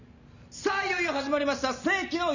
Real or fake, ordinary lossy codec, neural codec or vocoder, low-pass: real; none; none; 7.2 kHz